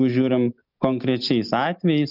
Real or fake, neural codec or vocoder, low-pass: real; none; 5.4 kHz